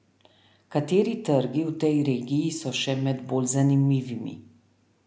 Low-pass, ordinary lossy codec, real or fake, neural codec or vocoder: none; none; real; none